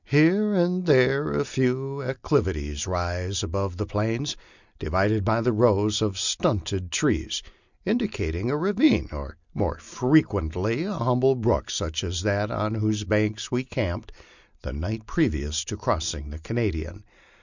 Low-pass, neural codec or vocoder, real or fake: 7.2 kHz; none; real